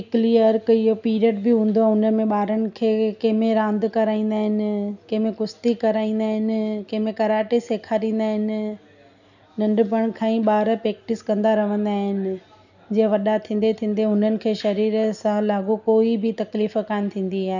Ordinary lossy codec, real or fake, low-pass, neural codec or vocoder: none; real; 7.2 kHz; none